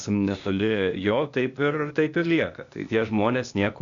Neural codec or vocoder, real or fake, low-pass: codec, 16 kHz, 0.8 kbps, ZipCodec; fake; 7.2 kHz